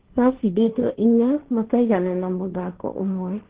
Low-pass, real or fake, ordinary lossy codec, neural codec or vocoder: 3.6 kHz; fake; Opus, 16 kbps; codec, 16 kHz, 1.1 kbps, Voila-Tokenizer